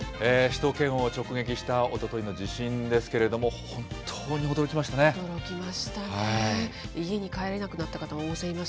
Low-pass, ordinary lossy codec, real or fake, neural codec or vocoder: none; none; real; none